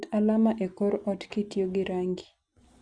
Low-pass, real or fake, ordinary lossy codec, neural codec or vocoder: 9.9 kHz; real; Opus, 64 kbps; none